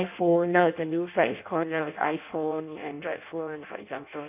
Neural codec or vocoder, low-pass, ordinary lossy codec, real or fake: codec, 16 kHz in and 24 kHz out, 0.6 kbps, FireRedTTS-2 codec; 3.6 kHz; none; fake